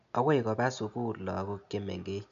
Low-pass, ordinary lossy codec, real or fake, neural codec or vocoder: 7.2 kHz; none; real; none